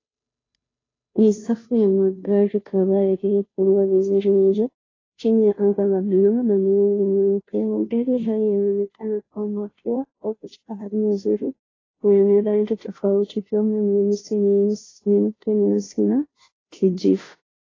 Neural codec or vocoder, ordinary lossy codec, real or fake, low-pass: codec, 16 kHz, 0.5 kbps, FunCodec, trained on Chinese and English, 25 frames a second; AAC, 32 kbps; fake; 7.2 kHz